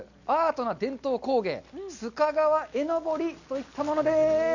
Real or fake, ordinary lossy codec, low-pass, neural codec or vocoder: real; none; 7.2 kHz; none